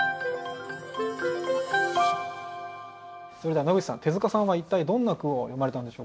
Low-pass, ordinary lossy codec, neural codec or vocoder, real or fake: none; none; none; real